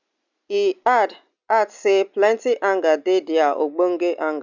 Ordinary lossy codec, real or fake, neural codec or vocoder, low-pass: none; real; none; 7.2 kHz